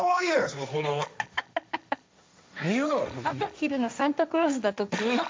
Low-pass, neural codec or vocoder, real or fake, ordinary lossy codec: none; codec, 16 kHz, 1.1 kbps, Voila-Tokenizer; fake; none